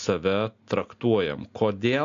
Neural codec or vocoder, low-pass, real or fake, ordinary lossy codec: none; 7.2 kHz; real; AAC, 64 kbps